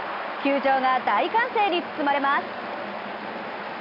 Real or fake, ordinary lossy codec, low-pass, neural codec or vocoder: real; none; 5.4 kHz; none